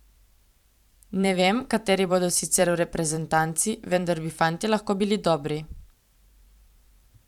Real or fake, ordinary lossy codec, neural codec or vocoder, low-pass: fake; none; vocoder, 44.1 kHz, 128 mel bands every 512 samples, BigVGAN v2; 19.8 kHz